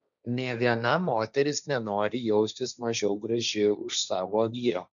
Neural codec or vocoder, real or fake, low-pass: codec, 16 kHz, 1.1 kbps, Voila-Tokenizer; fake; 7.2 kHz